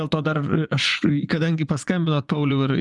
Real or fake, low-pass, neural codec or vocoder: fake; 10.8 kHz; vocoder, 24 kHz, 100 mel bands, Vocos